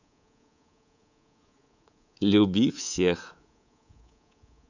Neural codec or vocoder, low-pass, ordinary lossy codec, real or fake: codec, 24 kHz, 3.1 kbps, DualCodec; 7.2 kHz; none; fake